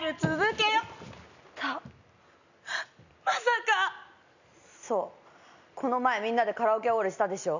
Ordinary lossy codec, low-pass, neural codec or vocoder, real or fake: none; 7.2 kHz; none; real